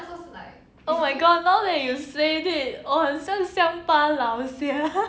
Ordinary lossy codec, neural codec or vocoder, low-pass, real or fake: none; none; none; real